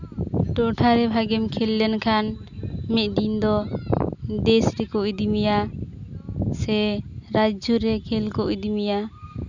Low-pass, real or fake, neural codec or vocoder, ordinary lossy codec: 7.2 kHz; real; none; none